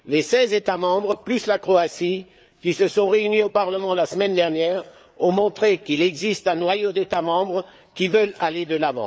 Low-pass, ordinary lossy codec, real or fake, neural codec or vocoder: none; none; fake; codec, 16 kHz, 4 kbps, FreqCodec, larger model